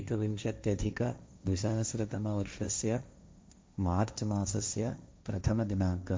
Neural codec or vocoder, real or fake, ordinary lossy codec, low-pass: codec, 16 kHz, 1.1 kbps, Voila-Tokenizer; fake; none; none